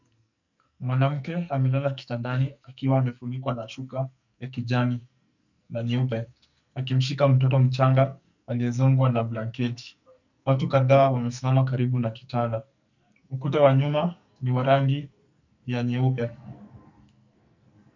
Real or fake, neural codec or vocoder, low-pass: fake; codec, 44.1 kHz, 2.6 kbps, SNAC; 7.2 kHz